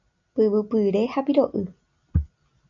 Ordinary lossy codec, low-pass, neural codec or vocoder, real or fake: MP3, 64 kbps; 7.2 kHz; none; real